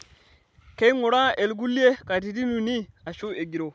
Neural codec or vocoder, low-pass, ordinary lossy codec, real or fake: none; none; none; real